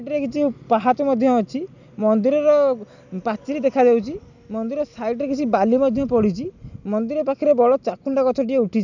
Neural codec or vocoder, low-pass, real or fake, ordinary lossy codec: none; 7.2 kHz; real; none